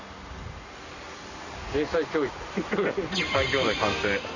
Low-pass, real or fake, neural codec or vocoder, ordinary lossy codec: 7.2 kHz; real; none; none